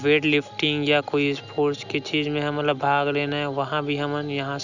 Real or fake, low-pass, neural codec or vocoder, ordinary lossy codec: real; 7.2 kHz; none; none